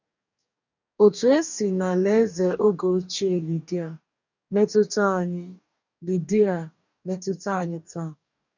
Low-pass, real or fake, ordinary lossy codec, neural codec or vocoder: 7.2 kHz; fake; none; codec, 44.1 kHz, 2.6 kbps, DAC